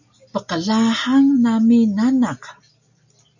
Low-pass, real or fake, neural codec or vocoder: 7.2 kHz; real; none